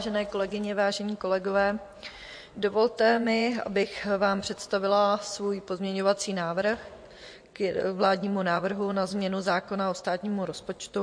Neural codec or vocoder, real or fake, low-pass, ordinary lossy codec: vocoder, 24 kHz, 100 mel bands, Vocos; fake; 9.9 kHz; MP3, 48 kbps